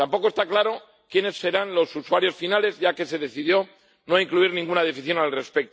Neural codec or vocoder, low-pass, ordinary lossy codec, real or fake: none; none; none; real